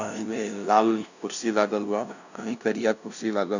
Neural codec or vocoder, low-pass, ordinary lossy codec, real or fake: codec, 16 kHz, 0.5 kbps, FunCodec, trained on LibriTTS, 25 frames a second; 7.2 kHz; none; fake